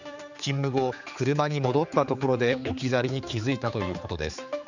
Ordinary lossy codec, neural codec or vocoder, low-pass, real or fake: none; codec, 16 kHz, 4 kbps, X-Codec, HuBERT features, trained on general audio; 7.2 kHz; fake